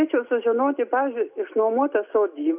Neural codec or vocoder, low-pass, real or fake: none; 3.6 kHz; real